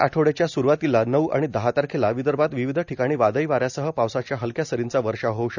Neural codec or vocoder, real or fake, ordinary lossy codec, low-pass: none; real; none; 7.2 kHz